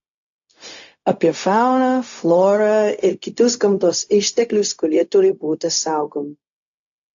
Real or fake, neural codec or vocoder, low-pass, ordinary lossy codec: fake; codec, 16 kHz, 0.4 kbps, LongCat-Audio-Codec; 7.2 kHz; AAC, 64 kbps